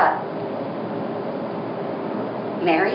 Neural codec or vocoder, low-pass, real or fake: none; 5.4 kHz; real